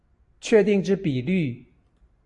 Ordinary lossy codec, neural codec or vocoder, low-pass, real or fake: MP3, 48 kbps; none; 10.8 kHz; real